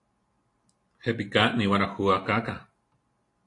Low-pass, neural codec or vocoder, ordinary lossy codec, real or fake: 10.8 kHz; none; AAC, 48 kbps; real